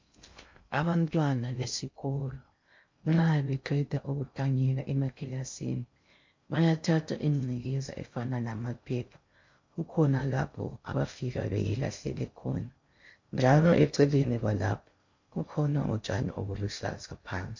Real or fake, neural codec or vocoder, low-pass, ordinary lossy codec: fake; codec, 16 kHz in and 24 kHz out, 0.6 kbps, FocalCodec, streaming, 2048 codes; 7.2 kHz; MP3, 48 kbps